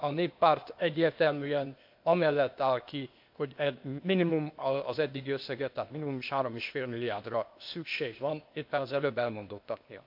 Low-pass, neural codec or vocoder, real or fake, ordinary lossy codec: 5.4 kHz; codec, 16 kHz, 0.8 kbps, ZipCodec; fake; MP3, 48 kbps